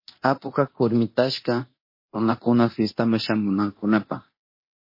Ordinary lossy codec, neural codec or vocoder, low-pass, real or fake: MP3, 24 kbps; codec, 24 kHz, 0.9 kbps, DualCodec; 5.4 kHz; fake